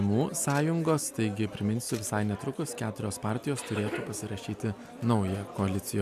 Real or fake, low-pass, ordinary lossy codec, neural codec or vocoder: real; 14.4 kHz; AAC, 96 kbps; none